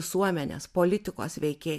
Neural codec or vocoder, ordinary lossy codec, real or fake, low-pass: none; MP3, 96 kbps; real; 14.4 kHz